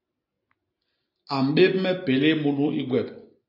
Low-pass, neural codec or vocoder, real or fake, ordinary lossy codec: 5.4 kHz; none; real; AAC, 32 kbps